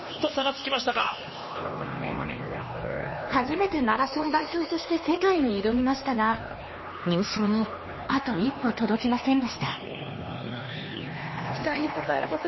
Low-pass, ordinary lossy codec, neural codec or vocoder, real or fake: 7.2 kHz; MP3, 24 kbps; codec, 16 kHz, 2 kbps, X-Codec, HuBERT features, trained on LibriSpeech; fake